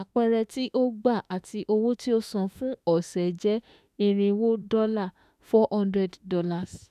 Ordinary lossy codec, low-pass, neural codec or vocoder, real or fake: none; 14.4 kHz; autoencoder, 48 kHz, 32 numbers a frame, DAC-VAE, trained on Japanese speech; fake